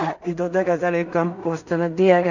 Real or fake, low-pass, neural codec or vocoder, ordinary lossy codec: fake; 7.2 kHz; codec, 16 kHz in and 24 kHz out, 0.4 kbps, LongCat-Audio-Codec, two codebook decoder; none